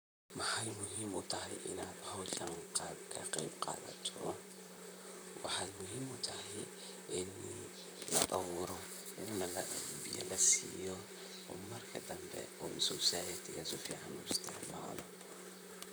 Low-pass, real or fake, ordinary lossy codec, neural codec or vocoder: none; fake; none; vocoder, 44.1 kHz, 128 mel bands, Pupu-Vocoder